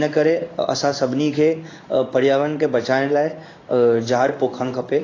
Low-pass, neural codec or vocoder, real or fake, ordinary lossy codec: 7.2 kHz; codec, 16 kHz in and 24 kHz out, 1 kbps, XY-Tokenizer; fake; AAC, 32 kbps